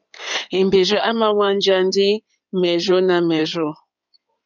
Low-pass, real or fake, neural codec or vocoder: 7.2 kHz; fake; codec, 16 kHz in and 24 kHz out, 2.2 kbps, FireRedTTS-2 codec